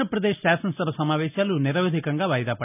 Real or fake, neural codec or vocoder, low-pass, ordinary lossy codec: real; none; 3.6 kHz; none